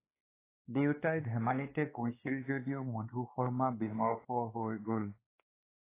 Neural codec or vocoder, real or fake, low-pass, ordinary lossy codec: codec, 16 kHz, 2 kbps, X-Codec, WavLM features, trained on Multilingual LibriSpeech; fake; 3.6 kHz; AAC, 24 kbps